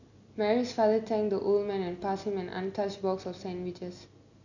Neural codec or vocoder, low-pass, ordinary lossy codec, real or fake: none; 7.2 kHz; none; real